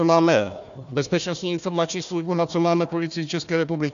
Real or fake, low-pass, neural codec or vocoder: fake; 7.2 kHz; codec, 16 kHz, 1 kbps, FunCodec, trained on Chinese and English, 50 frames a second